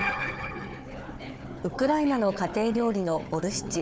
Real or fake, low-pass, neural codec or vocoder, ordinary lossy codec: fake; none; codec, 16 kHz, 4 kbps, FunCodec, trained on Chinese and English, 50 frames a second; none